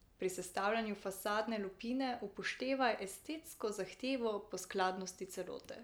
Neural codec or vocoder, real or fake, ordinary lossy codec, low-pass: none; real; none; none